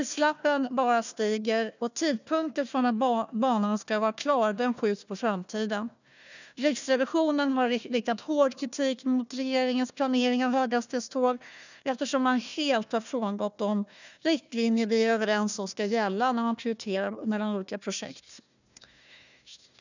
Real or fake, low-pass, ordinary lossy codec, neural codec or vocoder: fake; 7.2 kHz; none; codec, 16 kHz, 1 kbps, FunCodec, trained on Chinese and English, 50 frames a second